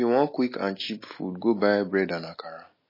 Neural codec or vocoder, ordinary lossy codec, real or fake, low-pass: none; MP3, 24 kbps; real; 5.4 kHz